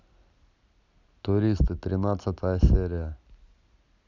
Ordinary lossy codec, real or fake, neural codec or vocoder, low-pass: none; real; none; 7.2 kHz